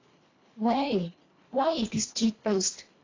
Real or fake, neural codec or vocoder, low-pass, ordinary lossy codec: fake; codec, 24 kHz, 1.5 kbps, HILCodec; 7.2 kHz; AAC, 32 kbps